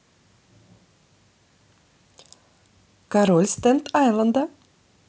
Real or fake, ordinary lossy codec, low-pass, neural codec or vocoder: real; none; none; none